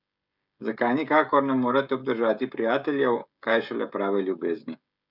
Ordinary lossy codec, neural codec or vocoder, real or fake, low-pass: none; codec, 16 kHz, 16 kbps, FreqCodec, smaller model; fake; 5.4 kHz